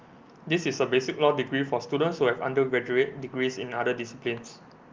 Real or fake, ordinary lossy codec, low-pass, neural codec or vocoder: real; Opus, 32 kbps; 7.2 kHz; none